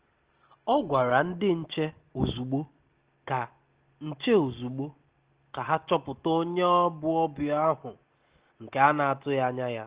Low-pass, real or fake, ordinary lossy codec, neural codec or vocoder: 3.6 kHz; real; Opus, 16 kbps; none